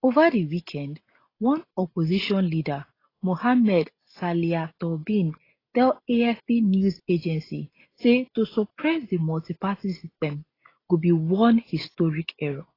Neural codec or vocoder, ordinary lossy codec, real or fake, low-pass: none; AAC, 24 kbps; real; 5.4 kHz